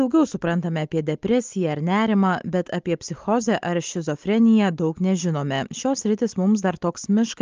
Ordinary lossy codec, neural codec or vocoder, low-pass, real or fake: Opus, 24 kbps; none; 7.2 kHz; real